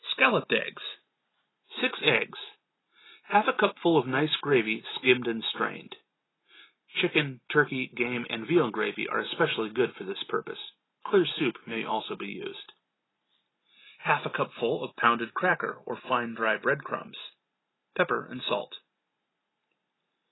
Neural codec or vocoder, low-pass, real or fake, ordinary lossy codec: none; 7.2 kHz; real; AAC, 16 kbps